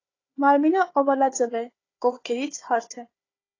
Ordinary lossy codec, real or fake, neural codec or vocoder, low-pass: AAC, 32 kbps; fake; codec, 16 kHz, 4 kbps, FunCodec, trained on Chinese and English, 50 frames a second; 7.2 kHz